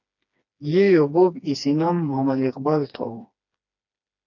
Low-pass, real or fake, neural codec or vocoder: 7.2 kHz; fake; codec, 16 kHz, 2 kbps, FreqCodec, smaller model